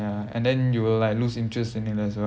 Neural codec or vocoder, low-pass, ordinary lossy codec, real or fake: none; none; none; real